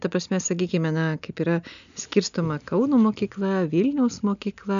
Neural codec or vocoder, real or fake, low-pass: none; real; 7.2 kHz